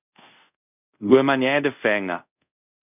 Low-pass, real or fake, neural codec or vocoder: 3.6 kHz; fake; codec, 24 kHz, 0.5 kbps, DualCodec